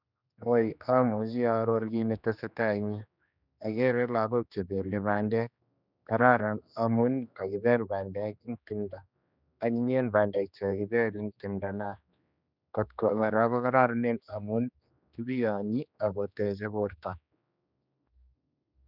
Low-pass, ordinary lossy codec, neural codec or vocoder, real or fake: 5.4 kHz; none; codec, 16 kHz, 2 kbps, X-Codec, HuBERT features, trained on general audio; fake